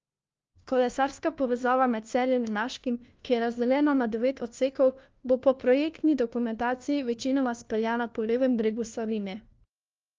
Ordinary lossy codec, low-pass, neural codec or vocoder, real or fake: Opus, 24 kbps; 7.2 kHz; codec, 16 kHz, 1 kbps, FunCodec, trained on LibriTTS, 50 frames a second; fake